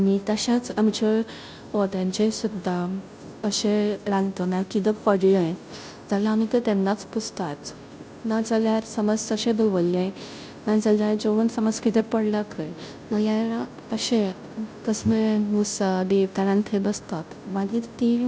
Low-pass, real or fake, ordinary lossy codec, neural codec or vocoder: none; fake; none; codec, 16 kHz, 0.5 kbps, FunCodec, trained on Chinese and English, 25 frames a second